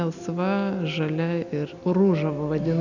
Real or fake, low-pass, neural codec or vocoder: real; 7.2 kHz; none